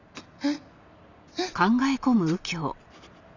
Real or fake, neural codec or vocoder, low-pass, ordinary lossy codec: real; none; 7.2 kHz; none